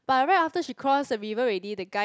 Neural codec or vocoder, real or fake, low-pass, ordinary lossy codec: none; real; none; none